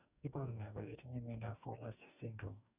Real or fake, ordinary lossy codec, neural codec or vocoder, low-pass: fake; none; codec, 44.1 kHz, 2.6 kbps, DAC; 3.6 kHz